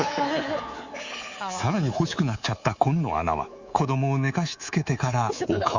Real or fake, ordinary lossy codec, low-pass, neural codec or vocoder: fake; Opus, 64 kbps; 7.2 kHz; codec, 24 kHz, 3.1 kbps, DualCodec